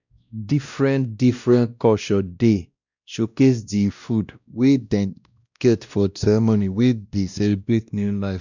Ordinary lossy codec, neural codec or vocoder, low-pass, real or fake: none; codec, 16 kHz, 1 kbps, X-Codec, WavLM features, trained on Multilingual LibriSpeech; 7.2 kHz; fake